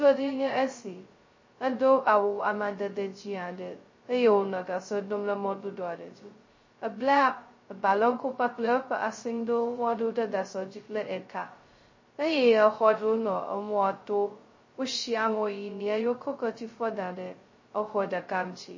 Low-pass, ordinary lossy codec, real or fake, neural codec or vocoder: 7.2 kHz; MP3, 32 kbps; fake; codec, 16 kHz, 0.2 kbps, FocalCodec